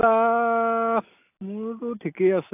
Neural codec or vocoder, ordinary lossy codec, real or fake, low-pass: none; AAC, 32 kbps; real; 3.6 kHz